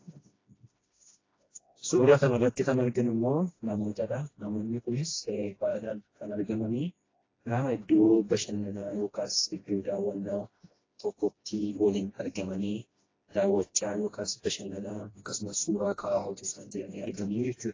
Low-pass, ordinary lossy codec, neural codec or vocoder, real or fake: 7.2 kHz; AAC, 32 kbps; codec, 16 kHz, 1 kbps, FreqCodec, smaller model; fake